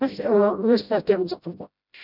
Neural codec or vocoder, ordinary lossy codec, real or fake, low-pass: codec, 16 kHz, 0.5 kbps, FreqCodec, smaller model; none; fake; 5.4 kHz